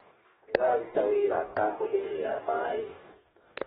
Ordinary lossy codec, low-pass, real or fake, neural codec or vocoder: AAC, 16 kbps; 19.8 kHz; fake; codec, 44.1 kHz, 2.6 kbps, DAC